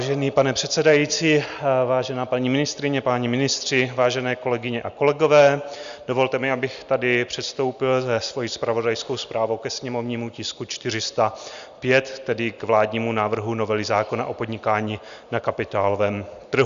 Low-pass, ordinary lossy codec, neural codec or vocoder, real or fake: 7.2 kHz; Opus, 64 kbps; none; real